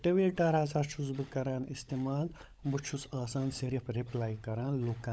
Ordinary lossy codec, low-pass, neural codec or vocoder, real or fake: none; none; codec, 16 kHz, 16 kbps, FunCodec, trained on LibriTTS, 50 frames a second; fake